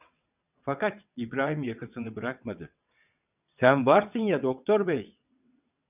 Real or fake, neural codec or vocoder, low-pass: fake; vocoder, 22.05 kHz, 80 mel bands, WaveNeXt; 3.6 kHz